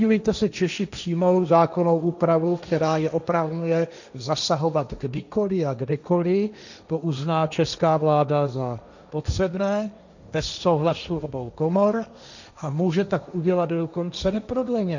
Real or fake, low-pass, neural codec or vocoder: fake; 7.2 kHz; codec, 16 kHz, 1.1 kbps, Voila-Tokenizer